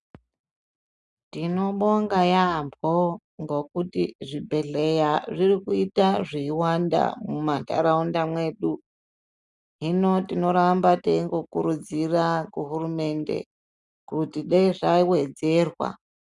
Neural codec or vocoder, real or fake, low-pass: none; real; 10.8 kHz